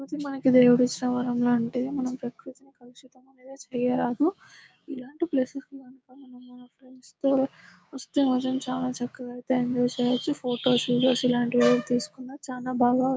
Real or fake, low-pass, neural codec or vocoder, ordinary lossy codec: fake; none; codec, 16 kHz, 6 kbps, DAC; none